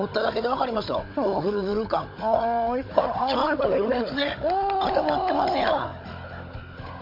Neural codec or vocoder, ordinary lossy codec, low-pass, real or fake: codec, 16 kHz, 16 kbps, FunCodec, trained on Chinese and English, 50 frames a second; MP3, 32 kbps; 5.4 kHz; fake